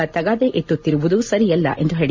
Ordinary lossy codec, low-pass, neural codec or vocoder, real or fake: MP3, 48 kbps; 7.2 kHz; none; real